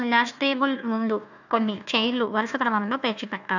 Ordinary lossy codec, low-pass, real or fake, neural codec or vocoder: none; 7.2 kHz; fake; codec, 16 kHz, 1 kbps, FunCodec, trained on Chinese and English, 50 frames a second